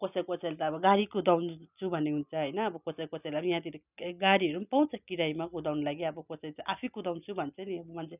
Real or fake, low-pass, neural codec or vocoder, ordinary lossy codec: real; 3.6 kHz; none; none